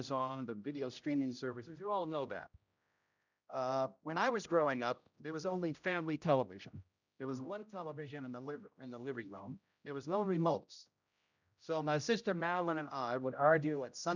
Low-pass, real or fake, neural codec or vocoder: 7.2 kHz; fake; codec, 16 kHz, 0.5 kbps, X-Codec, HuBERT features, trained on general audio